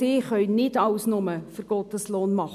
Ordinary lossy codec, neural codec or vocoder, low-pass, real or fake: none; none; 14.4 kHz; real